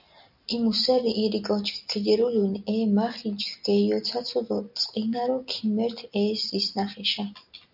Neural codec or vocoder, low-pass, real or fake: none; 5.4 kHz; real